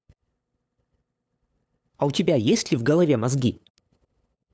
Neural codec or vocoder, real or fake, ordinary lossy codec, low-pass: codec, 16 kHz, 8 kbps, FunCodec, trained on LibriTTS, 25 frames a second; fake; none; none